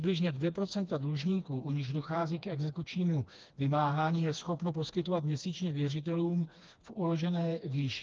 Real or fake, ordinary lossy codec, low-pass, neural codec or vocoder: fake; Opus, 24 kbps; 7.2 kHz; codec, 16 kHz, 2 kbps, FreqCodec, smaller model